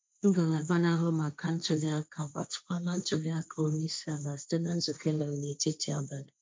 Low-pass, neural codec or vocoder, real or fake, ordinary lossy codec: none; codec, 16 kHz, 1.1 kbps, Voila-Tokenizer; fake; none